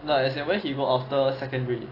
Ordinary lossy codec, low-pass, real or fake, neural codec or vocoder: AAC, 24 kbps; 5.4 kHz; real; none